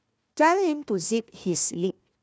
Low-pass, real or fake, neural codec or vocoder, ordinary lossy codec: none; fake; codec, 16 kHz, 1 kbps, FunCodec, trained on Chinese and English, 50 frames a second; none